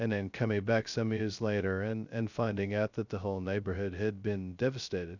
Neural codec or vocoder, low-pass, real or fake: codec, 16 kHz, 0.2 kbps, FocalCodec; 7.2 kHz; fake